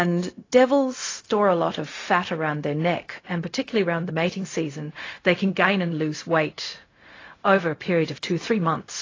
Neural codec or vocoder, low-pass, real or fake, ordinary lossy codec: codec, 16 kHz, 0.4 kbps, LongCat-Audio-Codec; 7.2 kHz; fake; AAC, 32 kbps